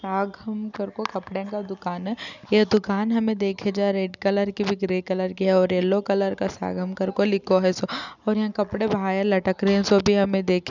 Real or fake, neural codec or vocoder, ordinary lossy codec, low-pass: real; none; none; 7.2 kHz